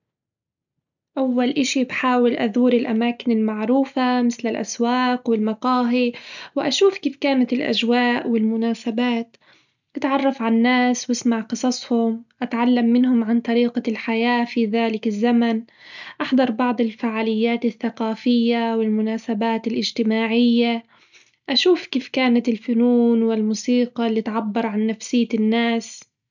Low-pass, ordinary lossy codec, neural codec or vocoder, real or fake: 7.2 kHz; none; none; real